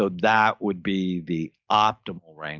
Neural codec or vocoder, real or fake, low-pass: none; real; 7.2 kHz